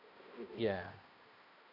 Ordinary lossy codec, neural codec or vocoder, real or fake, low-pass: none; codec, 16 kHz, 1 kbps, X-Codec, HuBERT features, trained on balanced general audio; fake; 5.4 kHz